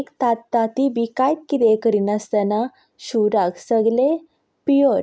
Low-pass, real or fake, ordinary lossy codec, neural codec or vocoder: none; real; none; none